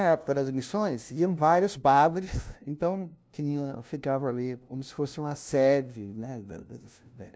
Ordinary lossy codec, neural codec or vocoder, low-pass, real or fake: none; codec, 16 kHz, 0.5 kbps, FunCodec, trained on LibriTTS, 25 frames a second; none; fake